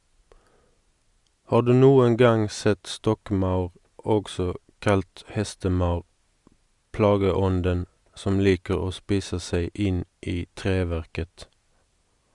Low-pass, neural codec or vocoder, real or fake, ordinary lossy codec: 10.8 kHz; none; real; Opus, 64 kbps